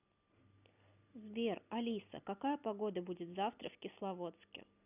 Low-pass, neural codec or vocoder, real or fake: 3.6 kHz; none; real